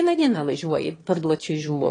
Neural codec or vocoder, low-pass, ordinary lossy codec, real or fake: autoencoder, 22.05 kHz, a latent of 192 numbers a frame, VITS, trained on one speaker; 9.9 kHz; AAC, 32 kbps; fake